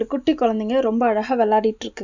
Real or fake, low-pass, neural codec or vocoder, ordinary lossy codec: real; 7.2 kHz; none; none